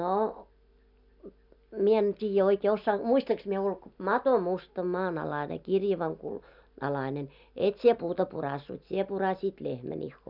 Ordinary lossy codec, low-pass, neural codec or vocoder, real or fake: none; 5.4 kHz; none; real